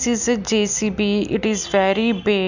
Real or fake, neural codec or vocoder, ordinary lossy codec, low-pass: real; none; none; 7.2 kHz